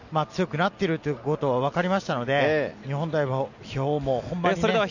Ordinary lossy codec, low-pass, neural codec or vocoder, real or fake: none; 7.2 kHz; none; real